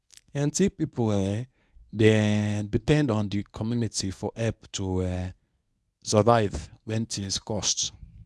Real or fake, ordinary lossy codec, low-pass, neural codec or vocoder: fake; none; none; codec, 24 kHz, 0.9 kbps, WavTokenizer, medium speech release version 1